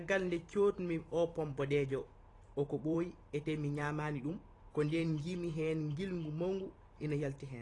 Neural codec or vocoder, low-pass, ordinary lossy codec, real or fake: vocoder, 44.1 kHz, 128 mel bands every 512 samples, BigVGAN v2; 10.8 kHz; AAC, 32 kbps; fake